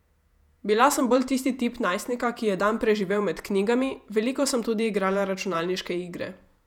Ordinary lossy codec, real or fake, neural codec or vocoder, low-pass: none; fake; vocoder, 48 kHz, 128 mel bands, Vocos; 19.8 kHz